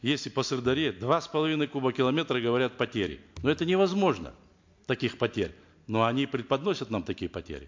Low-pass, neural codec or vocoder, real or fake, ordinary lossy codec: 7.2 kHz; none; real; MP3, 48 kbps